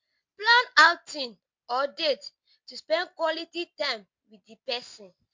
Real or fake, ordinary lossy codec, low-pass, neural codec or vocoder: real; MP3, 48 kbps; 7.2 kHz; none